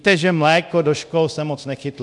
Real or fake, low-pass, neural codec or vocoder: fake; 10.8 kHz; codec, 24 kHz, 0.9 kbps, DualCodec